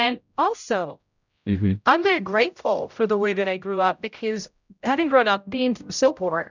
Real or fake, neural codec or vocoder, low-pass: fake; codec, 16 kHz, 0.5 kbps, X-Codec, HuBERT features, trained on general audio; 7.2 kHz